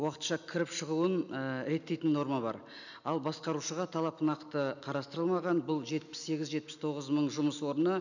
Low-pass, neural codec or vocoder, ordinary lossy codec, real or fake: 7.2 kHz; none; none; real